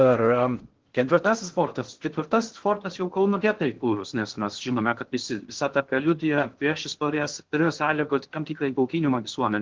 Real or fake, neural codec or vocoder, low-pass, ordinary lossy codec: fake; codec, 16 kHz in and 24 kHz out, 0.6 kbps, FocalCodec, streaming, 2048 codes; 7.2 kHz; Opus, 16 kbps